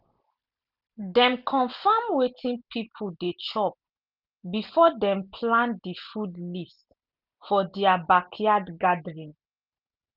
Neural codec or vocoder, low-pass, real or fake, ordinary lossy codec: none; 5.4 kHz; real; none